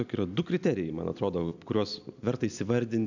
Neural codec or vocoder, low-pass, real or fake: none; 7.2 kHz; real